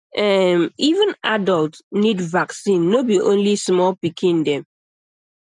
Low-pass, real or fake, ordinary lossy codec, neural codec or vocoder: 10.8 kHz; real; none; none